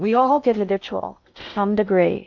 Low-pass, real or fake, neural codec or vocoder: 7.2 kHz; fake; codec, 16 kHz in and 24 kHz out, 0.6 kbps, FocalCodec, streaming, 2048 codes